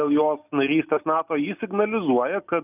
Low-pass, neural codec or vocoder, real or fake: 3.6 kHz; none; real